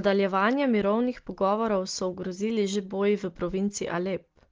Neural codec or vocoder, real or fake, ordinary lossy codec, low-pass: none; real; Opus, 16 kbps; 7.2 kHz